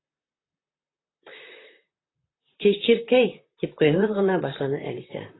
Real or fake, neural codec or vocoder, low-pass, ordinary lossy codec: fake; vocoder, 44.1 kHz, 128 mel bands, Pupu-Vocoder; 7.2 kHz; AAC, 16 kbps